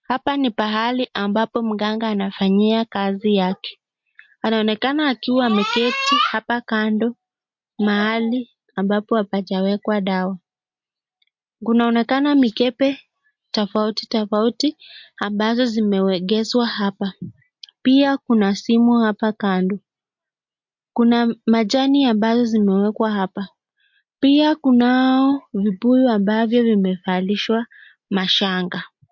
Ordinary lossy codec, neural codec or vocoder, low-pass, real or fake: MP3, 48 kbps; none; 7.2 kHz; real